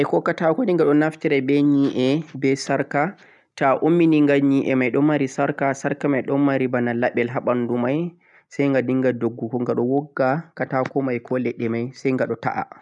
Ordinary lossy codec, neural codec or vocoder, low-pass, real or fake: none; none; 10.8 kHz; real